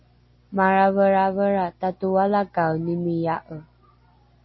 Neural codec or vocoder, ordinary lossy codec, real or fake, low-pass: none; MP3, 24 kbps; real; 7.2 kHz